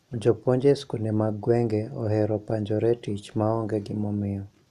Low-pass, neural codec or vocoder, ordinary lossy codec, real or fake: 14.4 kHz; none; none; real